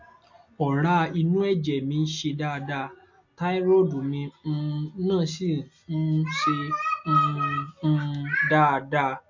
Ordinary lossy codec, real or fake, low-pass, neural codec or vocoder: MP3, 48 kbps; real; 7.2 kHz; none